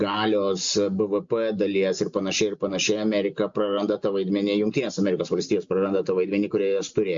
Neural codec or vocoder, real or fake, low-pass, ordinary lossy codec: none; real; 7.2 kHz; MP3, 48 kbps